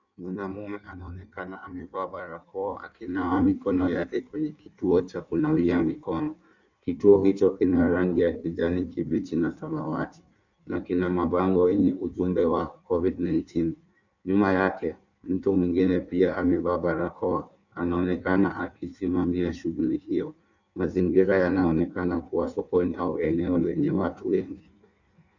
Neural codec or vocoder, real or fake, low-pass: codec, 16 kHz in and 24 kHz out, 1.1 kbps, FireRedTTS-2 codec; fake; 7.2 kHz